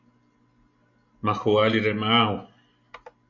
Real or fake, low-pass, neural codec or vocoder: real; 7.2 kHz; none